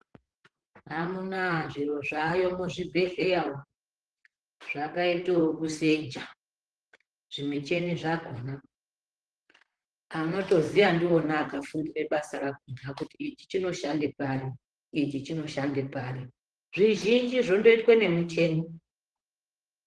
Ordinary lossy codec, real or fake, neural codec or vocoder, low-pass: Opus, 16 kbps; fake; vocoder, 44.1 kHz, 128 mel bands, Pupu-Vocoder; 10.8 kHz